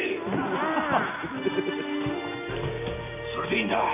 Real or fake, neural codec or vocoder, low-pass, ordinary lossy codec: real; none; 3.6 kHz; AAC, 32 kbps